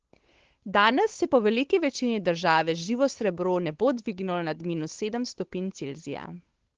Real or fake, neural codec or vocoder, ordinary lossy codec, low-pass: fake; codec, 16 kHz, 8 kbps, FunCodec, trained on Chinese and English, 25 frames a second; Opus, 16 kbps; 7.2 kHz